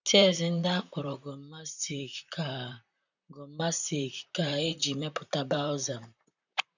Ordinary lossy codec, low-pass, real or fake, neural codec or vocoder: none; 7.2 kHz; fake; vocoder, 44.1 kHz, 128 mel bands, Pupu-Vocoder